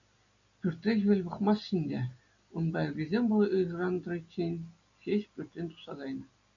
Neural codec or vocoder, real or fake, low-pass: none; real; 7.2 kHz